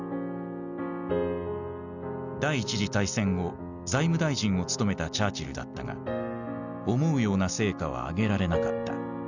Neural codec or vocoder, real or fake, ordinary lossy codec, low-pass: none; real; none; 7.2 kHz